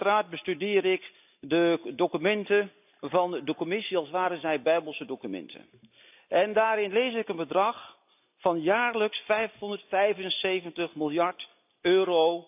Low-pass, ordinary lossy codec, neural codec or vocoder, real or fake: 3.6 kHz; AAC, 32 kbps; none; real